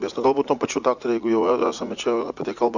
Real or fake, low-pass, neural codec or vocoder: fake; 7.2 kHz; vocoder, 44.1 kHz, 80 mel bands, Vocos